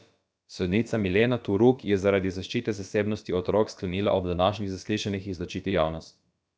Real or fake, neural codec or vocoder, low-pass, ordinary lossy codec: fake; codec, 16 kHz, about 1 kbps, DyCAST, with the encoder's durations; none; none